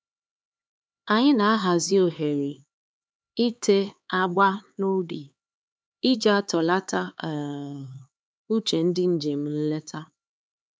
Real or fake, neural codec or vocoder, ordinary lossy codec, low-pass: fake; codec, 16 kHz, 4 kbps, X-Codec, HuBERT features, trained on LibriSpeech; none; none